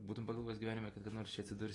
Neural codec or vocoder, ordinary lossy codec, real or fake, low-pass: none; AAC, 32 kbps; real; 10.8 kHz